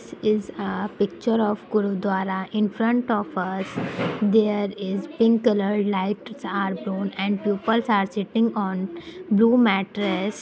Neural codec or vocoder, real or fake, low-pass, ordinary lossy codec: none; real; none; none